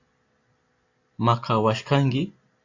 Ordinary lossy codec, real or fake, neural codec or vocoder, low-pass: AAC, 48 kbps; real; none; 7.2 kHz